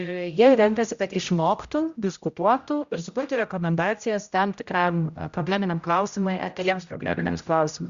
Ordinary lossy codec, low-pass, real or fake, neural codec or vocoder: Opus, 64 kbps; 7.2 kHz; fake; codec, 16 kHz, 0.5 kbps, X-Codec, HuBERT features, trained on general audio